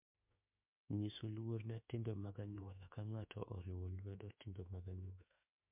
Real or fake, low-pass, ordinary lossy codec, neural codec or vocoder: fake; 3.6 kHz; MP3, 32 kbps; autoencoder, 48 kHz, 32 numbers a frame, DAC-VAE, trained on Japanese speech